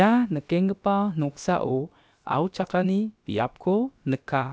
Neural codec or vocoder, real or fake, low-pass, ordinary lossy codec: codec, 16 kHz, about 1 kbps, DyCAST, with the encoder's durations; fake; none; none